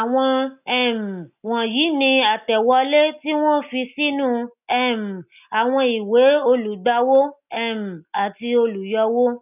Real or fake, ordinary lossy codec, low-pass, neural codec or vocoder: real; none; 3.6 kHz; none